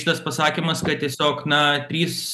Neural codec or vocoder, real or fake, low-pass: none; real; 14.4 kHz